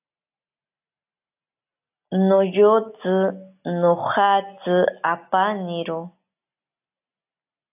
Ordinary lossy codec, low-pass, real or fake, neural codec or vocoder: AAC, 32 kbps; 3.6 kHz; real; none